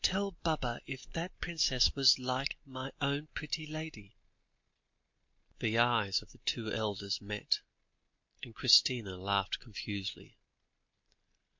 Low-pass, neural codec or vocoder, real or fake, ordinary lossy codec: 7.2 kHz; none; real; MP3, 48 kbps